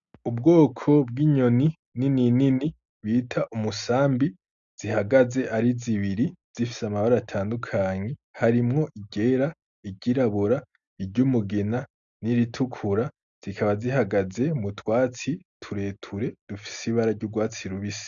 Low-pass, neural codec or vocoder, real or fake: 7.2 kHz; none; real